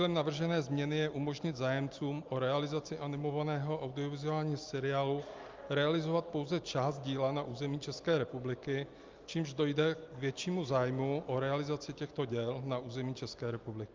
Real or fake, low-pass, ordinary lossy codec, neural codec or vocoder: real; 7.2 kHz; Opus, 24 kbps; none